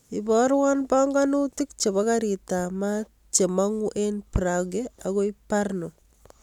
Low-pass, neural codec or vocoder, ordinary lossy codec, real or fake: 19.8 kHz; none; none; real